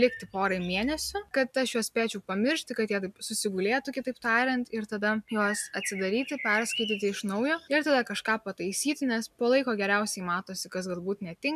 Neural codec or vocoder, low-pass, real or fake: none; 14.4 kHz; real